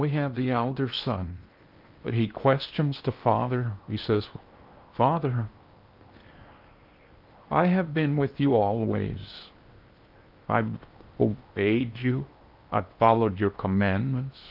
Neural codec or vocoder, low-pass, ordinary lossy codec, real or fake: codec, 16 kHz in and 24 kHz out, 0.8 kbps, FocalCodec, streaming, 65536 codes; 5.4 kHz; Opus, 32 kbps; fake